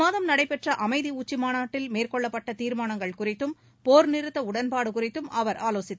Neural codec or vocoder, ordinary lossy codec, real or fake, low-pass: none; none; real; none